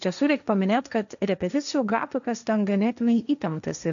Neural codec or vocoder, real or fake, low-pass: codec, 16 kHz, 1.1 kbps, Voila-Tokenizer; fake; 7.2 kHz